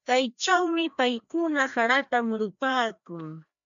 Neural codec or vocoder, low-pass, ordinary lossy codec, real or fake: codec, 16 kHz, 1 kbps, FreqCodec, larger model; 7.2 kHz; MP3, 64 kbps; fake